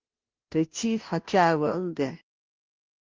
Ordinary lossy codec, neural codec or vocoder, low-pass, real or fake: Opus, 32 kbps; codec, 16 kHz, 0.5 kbps, FunCodec, trained on Chinese and English, 25 frames a second; 7.2 kHz; fake